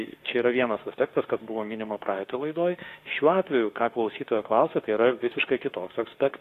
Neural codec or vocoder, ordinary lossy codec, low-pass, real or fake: autoencoder, 48 kHz, 32 numbers a frame, DAC-VAE, trained on Japanese speech; AAC, 64 kbps; 14.4 kHz; fake